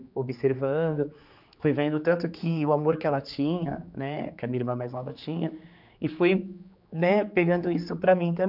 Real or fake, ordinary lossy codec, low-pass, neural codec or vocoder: fake; none; 5.4 kHz; codec, 16 kHz, 4 kbps, X-Codec, HuBERT features, trained on general audio